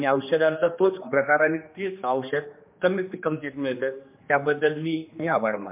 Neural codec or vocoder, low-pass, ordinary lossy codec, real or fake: codec, 16 kHz, 2 kbps, X-Codec, HuBERT features, trained on general audio; 3.6 kHz; MP3, 32 kbps; fake